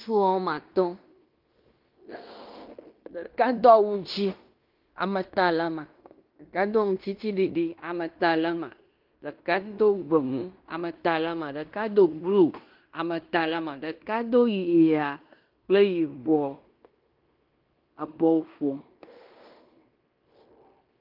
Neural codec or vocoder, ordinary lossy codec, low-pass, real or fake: codec, 16 kHz in and 24 kHz out, 0.9 kbps, LongCat-Audio-Codec, fine tuned four codebook decoder; Opus, 24 kbps; 5.4 kHz; fake